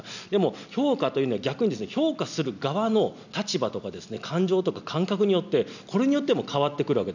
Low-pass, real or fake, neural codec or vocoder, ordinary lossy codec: 7.2 kHz; real; none; none